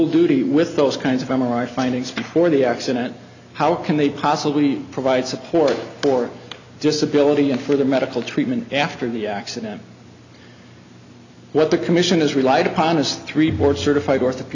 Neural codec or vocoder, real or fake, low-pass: none; real; 7.2 kHz